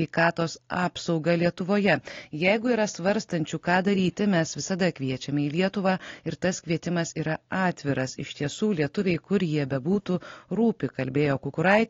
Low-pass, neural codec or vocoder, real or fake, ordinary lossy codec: 7.2 kHz; none; real; AAC, 32 kbps